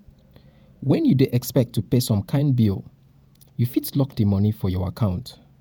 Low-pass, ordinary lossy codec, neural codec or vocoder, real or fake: none; none; vocoder, 48 kHz, 128 mel bands, Vocos; fake